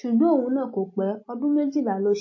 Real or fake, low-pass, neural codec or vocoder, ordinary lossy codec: real; 7.2 kHz; none; MP3, 32 kbps